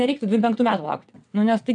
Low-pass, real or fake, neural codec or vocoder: 9.9 kHz; fake; vocoder, 22.05 kHz, 80 mel bands, WaveNeXt